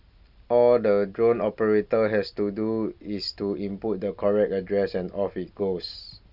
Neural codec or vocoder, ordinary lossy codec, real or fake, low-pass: none; Opus, 64 kbps; real; 5.4 kHz